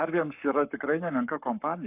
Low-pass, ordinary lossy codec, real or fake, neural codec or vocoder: 3.6 kHz; AAC, 32 kbps; fake; codec, 44.1 kHz, 7.8 kbps, Pupu-Codec